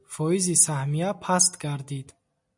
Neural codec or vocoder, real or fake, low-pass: none; real; 10.8 kHz